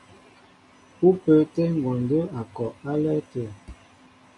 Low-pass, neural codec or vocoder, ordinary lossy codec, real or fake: 10.8 kHz; none; MP3, 48 kbps; real